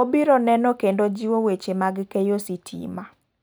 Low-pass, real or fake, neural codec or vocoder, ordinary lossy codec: none; real; none; none